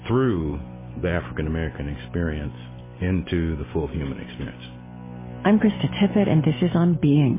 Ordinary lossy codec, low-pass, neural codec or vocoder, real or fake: MP3, 16 kbps; 3.6 kHz; none; real